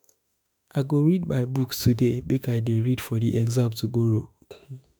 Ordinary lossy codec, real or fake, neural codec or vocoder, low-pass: none; fake; autoencoder, 48 kHz, 32 numbers a frame, DAC-VAE, trained on Japanese speech; none